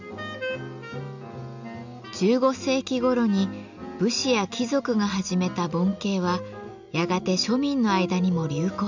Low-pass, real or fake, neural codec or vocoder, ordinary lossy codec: 7.2 kHz; real; none; none